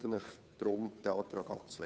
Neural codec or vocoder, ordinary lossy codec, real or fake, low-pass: codec, 16 kHz, 2 kbps, FunCodec, trained on Chinese and English, 25 frames a second; none; fake; none